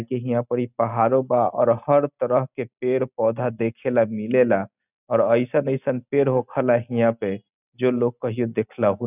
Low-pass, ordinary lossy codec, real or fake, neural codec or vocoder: 3.6 kHz; none; real; none